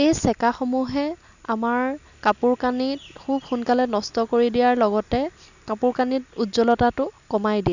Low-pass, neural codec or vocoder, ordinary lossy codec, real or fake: 7.2 kHz; none; none; real